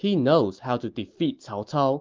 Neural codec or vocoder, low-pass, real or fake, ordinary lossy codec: none; 7.2 kHz; real; Opus, 24 kbps